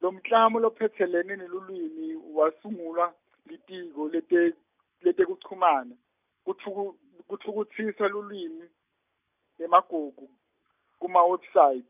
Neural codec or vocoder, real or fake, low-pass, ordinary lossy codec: none; real; 3.6 kHz; none